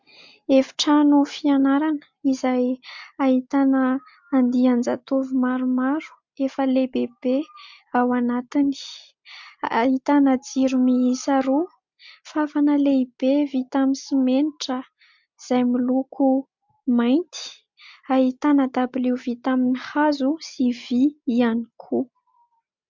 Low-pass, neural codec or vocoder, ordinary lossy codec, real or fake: 7.2 kHz; none; MP3, 64 kbps; real